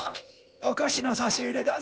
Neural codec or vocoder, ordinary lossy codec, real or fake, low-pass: codec, 16 kHz, 0.8 kbps, ZipCodec; none; fake; none